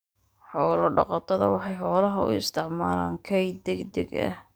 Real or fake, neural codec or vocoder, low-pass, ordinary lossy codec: fake; codec, 44.1 kHz, 7.8 kbps, DAC; none; none